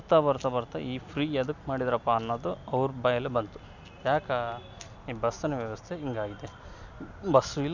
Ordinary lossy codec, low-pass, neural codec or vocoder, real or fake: none; 7.2 kHz; none; real